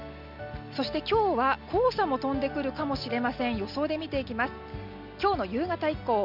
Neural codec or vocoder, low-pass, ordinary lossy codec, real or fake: none; 5.4 kHz; none; real